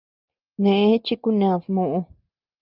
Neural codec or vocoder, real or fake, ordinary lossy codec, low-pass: none; real; Opus, 32 kbps; 5.4 kHz